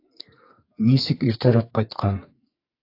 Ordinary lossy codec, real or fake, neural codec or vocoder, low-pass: AAC, 32 kbps; fake; codec, 44.1 kHz, 2.6 kbps, SNAC; 5.4 kHz